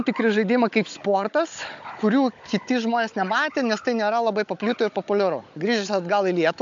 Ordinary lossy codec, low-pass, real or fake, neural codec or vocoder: AAC, 64 kbps; 7.2 kHz; fake; codec, 16 kHz, 16 kbps, FunCodec, trained on Chinese and English, 50 frames a second